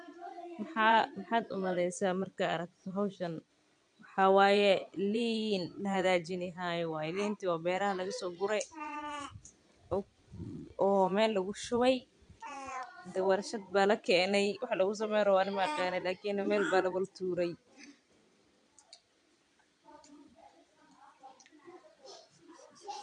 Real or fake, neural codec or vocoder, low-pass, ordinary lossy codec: fake; vocoder, 22.05 kHz, 80 mel bands, Vocos; 9.9 kHz; MP3, 64 kbps